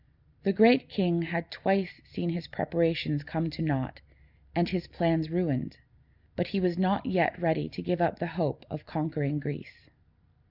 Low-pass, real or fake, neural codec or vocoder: 5.4 kHz; real; none